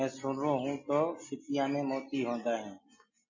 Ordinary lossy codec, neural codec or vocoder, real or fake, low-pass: MP3, 32 kbps; none; real; 7.2 kHz